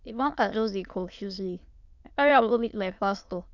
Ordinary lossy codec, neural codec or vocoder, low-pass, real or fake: none; autoencoder, 22.05 kHz, a latent of 192 numbers a frame, VITS, trained on many speakers; 7.2 kHz; fake